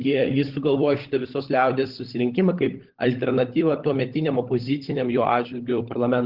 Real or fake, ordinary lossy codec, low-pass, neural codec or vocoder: fake; Opus, 16 kbps; 5.4 kHz; codec, 16 kHz, 16 kbps, FunCodec, trained on LibriTTS, 50 frames a second